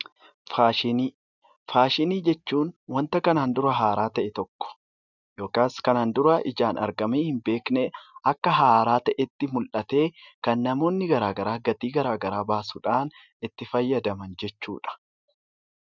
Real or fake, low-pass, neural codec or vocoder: real; 7.2 kHz; none